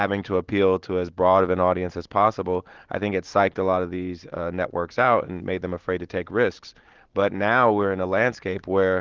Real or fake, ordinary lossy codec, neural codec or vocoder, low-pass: real; Opus, 16 kbps; none; 7.2 kHz